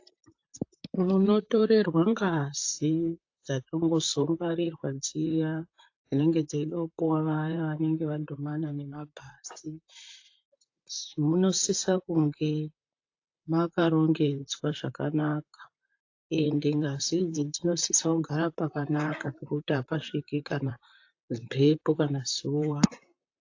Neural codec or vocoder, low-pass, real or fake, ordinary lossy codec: vocoder, 44.1 kHz, 128 mel bands, Pupu-Vocoder; 7.2 kHz; fake; AAC, 48 kbps